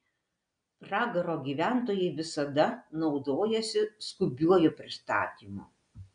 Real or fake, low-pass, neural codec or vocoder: real; 9.9 kHz; none